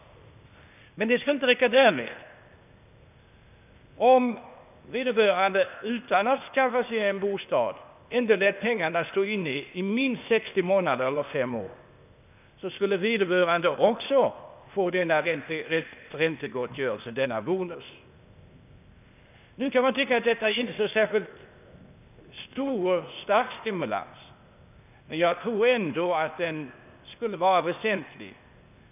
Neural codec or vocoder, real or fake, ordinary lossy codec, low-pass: codec, 16 kHz, 0.8 kbps, ZipCodec; fake; none; 3.6 kHz